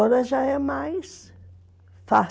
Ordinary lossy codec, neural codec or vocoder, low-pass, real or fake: none; none; none; real